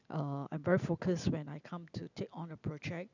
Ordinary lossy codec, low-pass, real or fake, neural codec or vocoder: none; 7.2 kHz; real; none